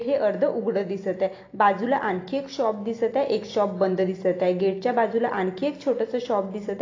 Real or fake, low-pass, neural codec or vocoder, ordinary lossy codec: real; 7.2 kHz; none; AAC, 32 kbps